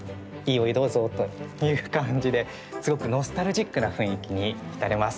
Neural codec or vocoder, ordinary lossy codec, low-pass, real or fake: none; none; none; real